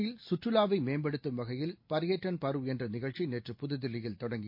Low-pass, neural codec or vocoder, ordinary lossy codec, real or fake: 5.4 kHz; none; MP3, 32 kbps; real